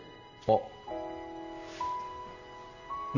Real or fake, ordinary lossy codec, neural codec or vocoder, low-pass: real; none; none; 7.2 kHz